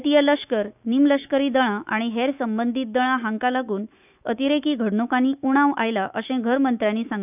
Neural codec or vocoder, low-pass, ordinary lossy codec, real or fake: autoencoder, 48 kHz, 128 numbers a frame, DAC-VAE, trained on Japanese speech; 3.6 kHz; none; fake